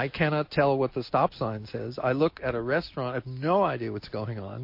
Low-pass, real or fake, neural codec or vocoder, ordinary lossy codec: 5.4 kHz; real; none; MP3, 32 kbps